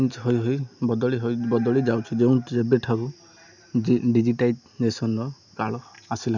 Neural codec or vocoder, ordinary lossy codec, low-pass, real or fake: none; none; 7.2 kHz; real